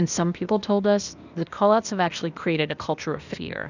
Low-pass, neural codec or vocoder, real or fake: 7.2 kHz; codec, 16 kHz, 0.8 kbps, ZipCodec; fake